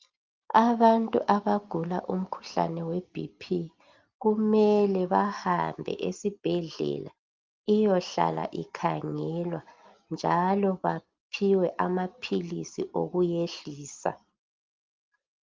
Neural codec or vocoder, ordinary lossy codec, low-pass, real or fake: none; Opus, 24 kbps; 7.2 kHz; real